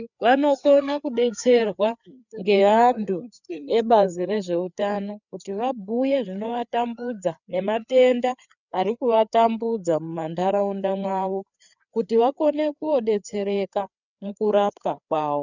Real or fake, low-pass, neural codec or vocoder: fake; 7.2 kHz; codec, 16 kHz, 4 kbps, FreqCodec, larger model